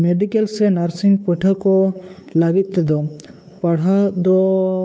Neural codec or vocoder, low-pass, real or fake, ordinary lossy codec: codec, 16 kHz, 4 kbps, X-Codec, WavLM features, trained on Multilingual LibriSpeech; none; fake; none